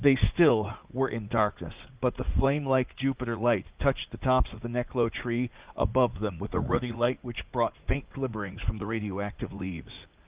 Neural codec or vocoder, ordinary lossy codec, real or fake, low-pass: vocoder, 44.1 kHz, 80 mel bands, Vocos; Opus, 24 kbps; fake; 3.6 kHz